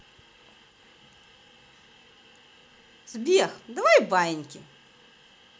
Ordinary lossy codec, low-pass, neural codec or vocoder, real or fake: none; none; none; real